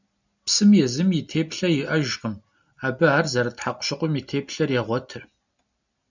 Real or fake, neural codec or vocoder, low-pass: real; none; 7.2 kHz